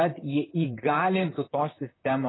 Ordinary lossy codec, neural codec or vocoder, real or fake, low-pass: AAC, 16 kbps; vocoder, 44.1 kHz, 128 mel bands, Pupu-Vocoder; fake; 7.2 kHz